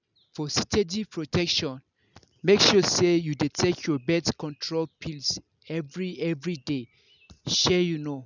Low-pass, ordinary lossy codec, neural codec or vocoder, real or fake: 7.2 kHz; none; none; real